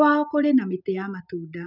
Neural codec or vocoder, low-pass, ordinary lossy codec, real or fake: none; 5.4 kHz; none; real